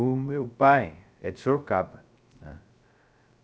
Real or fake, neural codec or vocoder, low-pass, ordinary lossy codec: fake; codec, 16 kHz, 0.3 kbps, FocalCodec; none; none